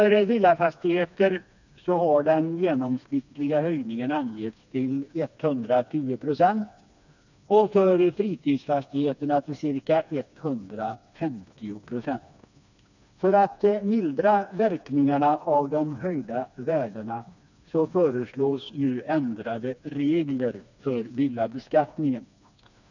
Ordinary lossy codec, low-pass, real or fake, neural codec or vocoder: none; 7.2 kHz; fake; codec, 16 kHz, 2 kbps, FreqCodec, smaller model